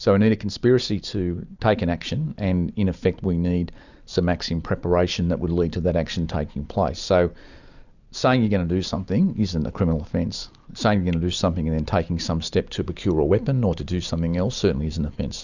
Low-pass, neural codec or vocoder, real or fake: 7.2 kHz; none; real